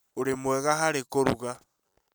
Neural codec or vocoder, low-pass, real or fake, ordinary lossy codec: none; none; real; none